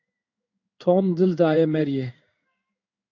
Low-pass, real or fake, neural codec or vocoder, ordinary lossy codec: 7.2 kHz; fake; codec, 16 kHz in and 24 kHz out, 1 kbps, XY-Tokenizer; AAC, 48 kbps